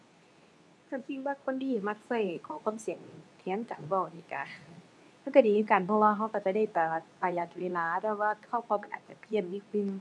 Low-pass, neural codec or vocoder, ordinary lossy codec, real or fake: none; codec, 24 kHz, 0.9 kbps, WavTokenizer, medium speech release version 2; none; fake